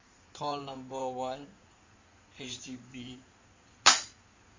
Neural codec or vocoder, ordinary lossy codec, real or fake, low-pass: codec, 16 kHz in and 24 kHz out, 2.2 kbps, FireRedTTS-2 codec; MP3, 48 kbps; fake; 7.2 kHz